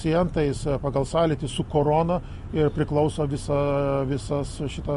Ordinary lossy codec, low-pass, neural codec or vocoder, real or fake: MP3, 48 kbps; 10.8 kHz; none; real